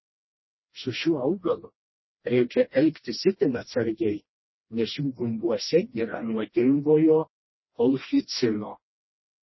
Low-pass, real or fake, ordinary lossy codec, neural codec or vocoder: 7.2 kHz; fake; MP3, 24 kbps; codec, 16 kHz, 1 kbps, FreqCodec, smaller model